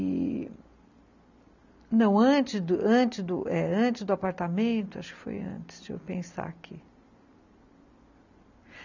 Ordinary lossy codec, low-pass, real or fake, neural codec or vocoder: none; 7.2 kHz; real; none